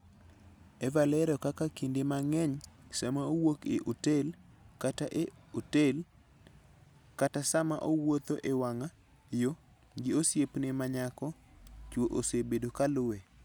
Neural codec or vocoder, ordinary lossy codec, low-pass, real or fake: none; none; none; real